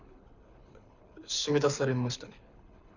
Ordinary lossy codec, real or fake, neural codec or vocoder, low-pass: none; fake; codec, 24 kHz, 6 kbps, HILCodec; 7.2 kHz